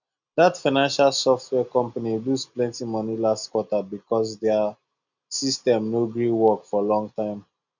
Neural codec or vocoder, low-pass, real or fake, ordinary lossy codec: none; 7.2 kHz; real; none